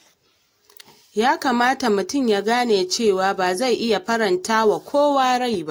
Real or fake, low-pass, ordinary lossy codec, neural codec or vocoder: real; 19.8 kHz; AAC, 48 kbps; none